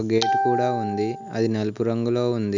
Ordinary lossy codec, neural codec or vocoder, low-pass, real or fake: none; none; 7.2 kHz; real